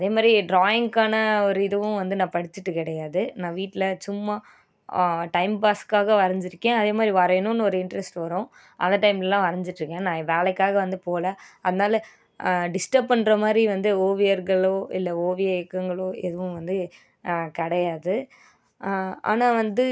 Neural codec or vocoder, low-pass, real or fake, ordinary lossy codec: none; none; real; none